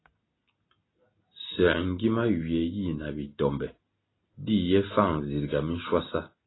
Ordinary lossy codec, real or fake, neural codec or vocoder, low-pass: AAC, 16 kbps; real; none; 7.2 kHz